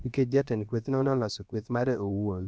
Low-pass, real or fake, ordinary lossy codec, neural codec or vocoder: none; fake; none; codec, 16 kHz, about 1 kbps, DyCAST, with the encoder's durations